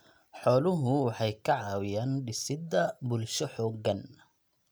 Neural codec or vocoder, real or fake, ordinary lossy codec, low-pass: none; real; none; none